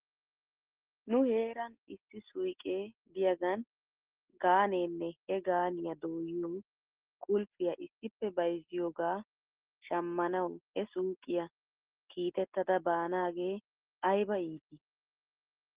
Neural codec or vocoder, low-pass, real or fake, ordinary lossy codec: none; 3.6 kHz; real; Opus, 16 kbps